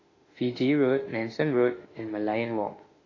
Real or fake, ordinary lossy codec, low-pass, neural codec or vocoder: fake; AAC, 32 kbps; 7.2 kHz; autoencoder, 48 kHz, 32 numbers a frame, DAC-VAE, trained on Japanese speech